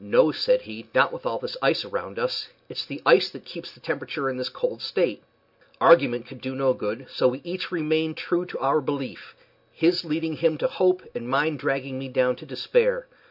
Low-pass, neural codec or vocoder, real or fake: 5.4 kHz; none; real